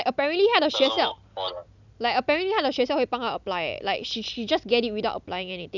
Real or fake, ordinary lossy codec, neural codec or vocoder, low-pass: real; none; none; 7.2 kHz